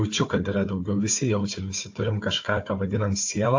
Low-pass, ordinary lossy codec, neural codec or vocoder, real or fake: 7.2 kHz; AAC, 48 kbps; codec, 16 kHz, 4 kbps, FunCodec, trained on Chinese and English, 50 frames a second; fake